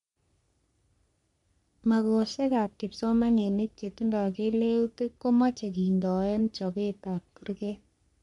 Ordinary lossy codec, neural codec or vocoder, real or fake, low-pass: none; codec, 44.1 kHz, 3.4 kbps, Pupu-Codec; fake; 10.8 kHz